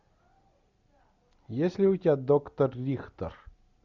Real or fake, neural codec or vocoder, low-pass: real; none; 7.2 kHz